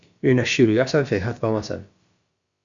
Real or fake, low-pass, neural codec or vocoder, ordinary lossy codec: fake; 7.2 kHz; codec, 16 kHz, about 1 kbps, DyCAST, with the encoder's durations; Opus, 64 kbps